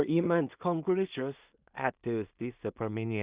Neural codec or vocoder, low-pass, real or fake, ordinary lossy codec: codec, 16 kHz in and 24 kHz out, 0.4 kbps, LongCat-Audio-Codec, two codebook decoder; 3.6 kHz; fake; Opus, 64 kbps